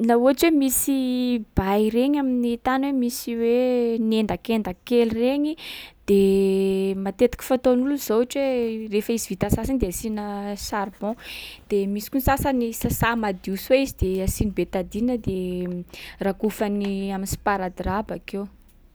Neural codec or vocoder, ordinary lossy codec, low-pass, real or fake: none; none; none; real